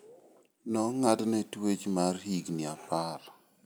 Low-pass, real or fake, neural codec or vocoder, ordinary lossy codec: none; real; none; none